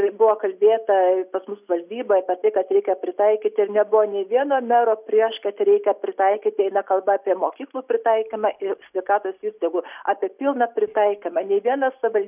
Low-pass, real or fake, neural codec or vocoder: 3.6 kHz; real; none